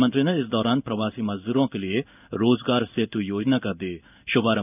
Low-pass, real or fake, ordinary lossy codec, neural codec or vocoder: 3.6 kHz; fake; none; codec, 16 kHz in and 24 kHz out, 1 kbps, XY-Tokenizer